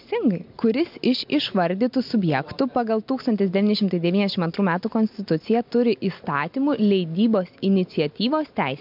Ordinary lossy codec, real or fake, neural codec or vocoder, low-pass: MP3, 48 kbps; real; none; 5.4 kHz